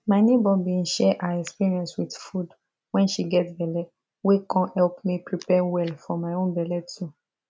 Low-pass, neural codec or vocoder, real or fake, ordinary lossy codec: none; none; real; none